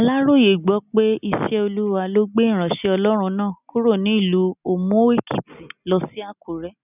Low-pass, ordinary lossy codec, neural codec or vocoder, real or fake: 3.6 kHz; none; none; real